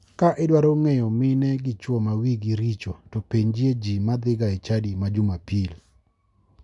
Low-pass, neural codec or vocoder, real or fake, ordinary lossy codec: 10.8 kHz; none; real; none